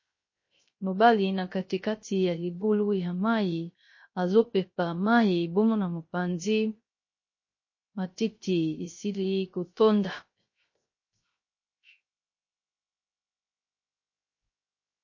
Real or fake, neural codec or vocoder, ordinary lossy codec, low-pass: fake; codec, 16 kHz, 0.3 kbps, FocalCodec; MP3, 32 kbps; 7.2 kHz